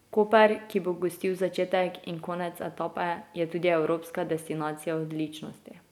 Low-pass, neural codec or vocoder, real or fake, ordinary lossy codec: 19.8 kHz; none; real; none